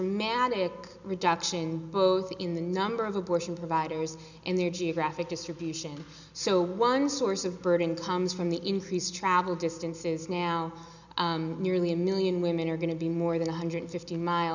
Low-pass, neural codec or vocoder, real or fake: 7.2 kHz; none; real